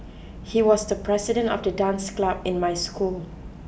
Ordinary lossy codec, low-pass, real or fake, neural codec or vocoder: none; none; real; none